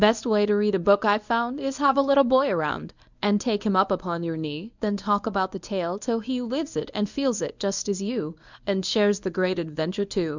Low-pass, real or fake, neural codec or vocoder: 7.2 kHz; fake; codec, 24 kHz, 0.9 kbps, WavTokenizer, medium speech release version 1